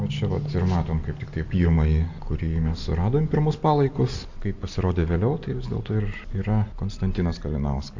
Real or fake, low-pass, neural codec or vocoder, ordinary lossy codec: real; 7.2 kHz; none; AAC, 48 kbps